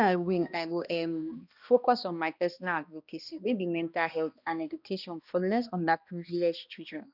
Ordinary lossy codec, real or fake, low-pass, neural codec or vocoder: none; fake; 5.4 kHz; codec, 16 kHz, 1 kbps, X-Codec, HuBERT features, trained on balanced general audio